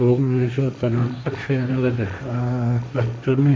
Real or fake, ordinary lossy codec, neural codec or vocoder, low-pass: fake; none; codec, 16 kHz, 1.1 kbps, Voila-Tokenizer; none